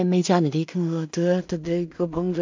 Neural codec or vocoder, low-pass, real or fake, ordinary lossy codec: codec, 16 kHz in and 24 kHz out, 0.4 kbps, LongCat-Audio-Codec, two codebook decoder; 7.2 kHz; fake; MP3, 64 kbps